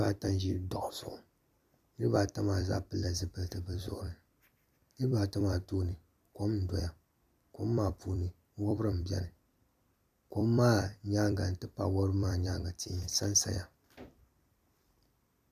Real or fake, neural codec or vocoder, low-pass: fake; vocoder, 44.1 kHz, 128 mel bands every 256 samples, BigVGAN v2; 14.4 kHz